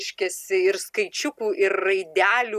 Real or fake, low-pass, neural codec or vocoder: real; 14.4 kHz; none